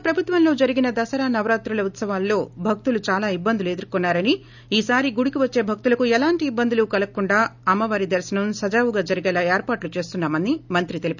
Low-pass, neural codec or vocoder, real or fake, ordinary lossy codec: 7.2 kHz; none; real; none